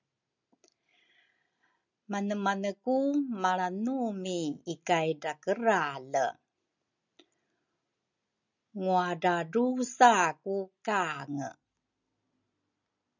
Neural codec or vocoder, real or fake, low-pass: none; real; 7.2 kHz